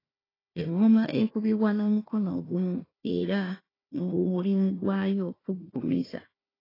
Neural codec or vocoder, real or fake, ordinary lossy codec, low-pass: codec, 16 kHz, 1 kbps, FunCodec, trained on Chinese and English, 50 frames a second; fake; AAC, 24 kbps; 5.4 kHz